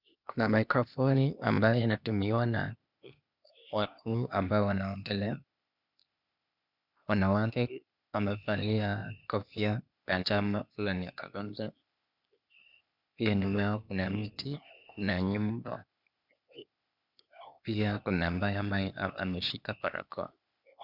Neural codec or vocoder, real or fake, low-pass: codec, 16 kHz, 0.8 kbps, ZipCodec; fake; 5.4 kHz